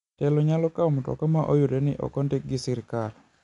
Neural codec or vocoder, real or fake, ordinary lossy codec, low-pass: none; real; none; 10.8 kHz